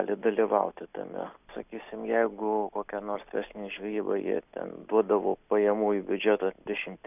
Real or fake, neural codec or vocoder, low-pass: real; none; 3.6 kHz